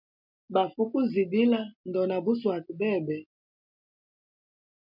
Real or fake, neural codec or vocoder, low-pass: real; none; 5.4 kHz